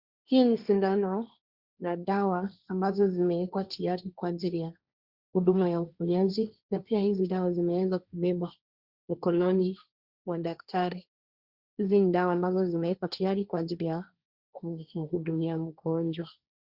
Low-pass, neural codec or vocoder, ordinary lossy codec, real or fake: 5.4 kHz; codec, 16 kHz, 1.1 kbps, Voila-Tokenizer; Opus, 64 kbps; fake